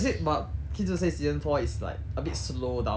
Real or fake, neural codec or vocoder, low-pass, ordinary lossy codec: real; none; none; none